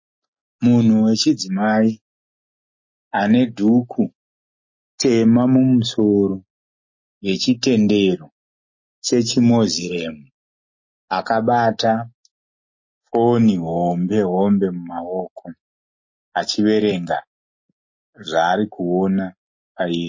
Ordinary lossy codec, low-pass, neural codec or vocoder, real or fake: MP3, 32 kbps; 7.2 kHz; none; real